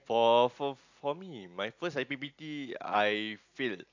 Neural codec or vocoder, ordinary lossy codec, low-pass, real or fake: none; AAC, 48 kbps; 7.2 kHz; real